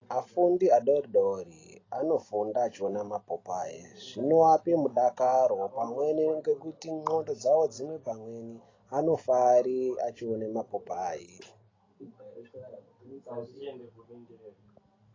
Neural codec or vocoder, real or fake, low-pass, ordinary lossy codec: none; real; 7.2 kHz; AAC, 32 kbps